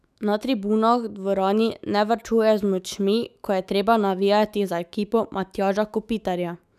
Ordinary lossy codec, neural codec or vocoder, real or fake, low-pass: none; autoencoder, 48 kHz, 128 numbers a frame, DAC-VAE, trained on Japanese speech; fake; 14.4 kHz